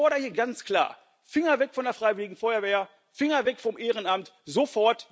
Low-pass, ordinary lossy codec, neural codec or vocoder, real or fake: none; none; none; real